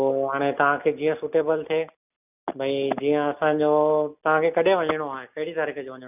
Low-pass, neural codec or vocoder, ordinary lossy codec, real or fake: 3.6 kHz; none; none; real